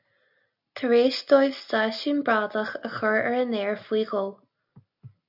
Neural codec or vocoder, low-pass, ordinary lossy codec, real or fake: none; 5.4 kHz; AAC, 48 kbps; real